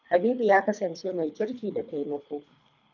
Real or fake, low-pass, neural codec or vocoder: fake; 7.2 kHz; codec, 24 kHz, 3 kbps, HILCodec